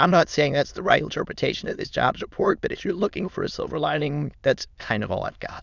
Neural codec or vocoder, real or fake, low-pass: autoencoder, 22.05 kHz, a latent of 192 numbers a frame, VITS, trained on many speakers; fake; 7.2 kHz